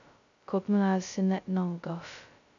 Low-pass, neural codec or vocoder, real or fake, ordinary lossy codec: 7.2 kHz; codec, 16 kHz, 0.2 kbps, FocalCodec; fake; AAC, 64 kbps